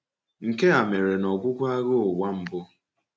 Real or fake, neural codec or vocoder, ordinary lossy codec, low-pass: real; none; none; none